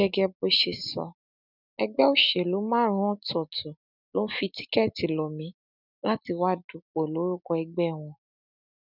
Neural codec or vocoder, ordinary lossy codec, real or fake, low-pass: none; none; real; 5.4 kHz